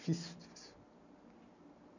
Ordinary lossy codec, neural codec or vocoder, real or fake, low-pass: none; none; real; 7.2 kHz